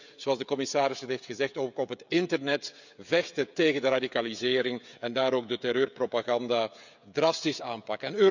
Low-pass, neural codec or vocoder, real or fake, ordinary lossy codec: 7.2 kHz; codec, 16 kHz, 16 kbps, FreqCodec, smaller model; fake; none